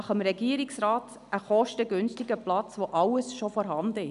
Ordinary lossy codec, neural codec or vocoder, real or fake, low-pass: none; none; real; 10.8 kHz